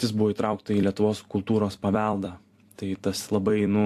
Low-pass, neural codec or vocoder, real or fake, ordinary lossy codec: 14.4 kHz; vocoder, 44.1 kHz, 128 mel bands every 256 samples, BigVGAN v2; fake; AAC, 64 kbps